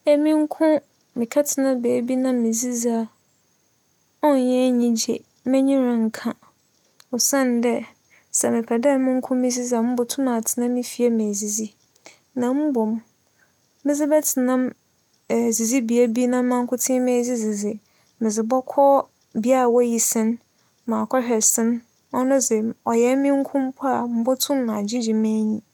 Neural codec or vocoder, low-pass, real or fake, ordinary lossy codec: none; 19.8 kHz; real; none